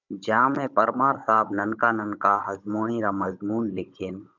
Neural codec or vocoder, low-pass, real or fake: codec, 16 kHz, 16 kbps, FunCodec, trained on Chinese and English, 50 frames a second; 7.2 kHz; fake